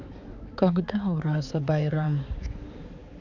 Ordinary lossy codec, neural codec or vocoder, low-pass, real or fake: none; codec, 16 kHz, 4 kbps, X-Codec, HuBERT features, trained on balanced general audio; 7.2 kHz; fake